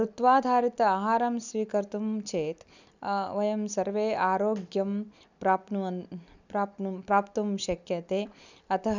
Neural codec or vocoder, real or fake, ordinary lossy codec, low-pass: none; real; none; 7.2 kHz